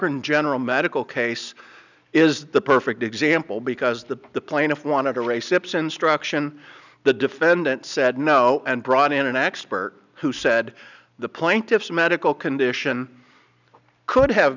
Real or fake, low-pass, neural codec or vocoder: real; 7.2 kHz; none